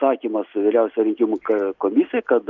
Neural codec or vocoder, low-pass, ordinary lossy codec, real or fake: none; 7.2 kHz; Opus, 32 kbps; real